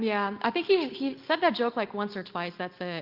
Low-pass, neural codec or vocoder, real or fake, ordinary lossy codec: 5.4 kHz; none; real; Opus, 32 kbps